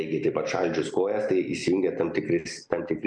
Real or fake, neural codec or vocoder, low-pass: real; none; 9.9 kHz